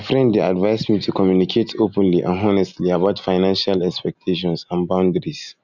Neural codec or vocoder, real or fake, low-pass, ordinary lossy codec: none; real; 7.2 kHz; none